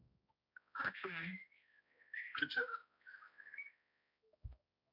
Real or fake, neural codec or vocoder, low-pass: fake; codec, 16 kHz, 1 kbps, X-Codec, HuBERT features, trained on balanced general audio; 5.4 kHz